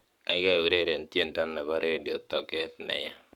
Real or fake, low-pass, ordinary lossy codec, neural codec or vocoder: fake; 19.8 kHz; none; codec, 44.1 kHz, 7.8 kbps, Pupu-Codec